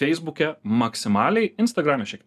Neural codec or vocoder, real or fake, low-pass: none; real; 14.4 kHz